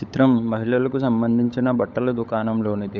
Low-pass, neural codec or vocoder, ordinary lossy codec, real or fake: none; codec, 16 kHz, 16 kbps, FunCodec, trained on LibriTTS, 50 frames a second; none; fake